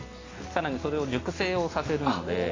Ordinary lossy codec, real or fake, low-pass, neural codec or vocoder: AAC, 32 kbps; real; 7.2 kHz; none